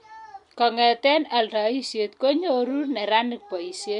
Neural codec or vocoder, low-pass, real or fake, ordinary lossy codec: none; 10.8 kHz; real; none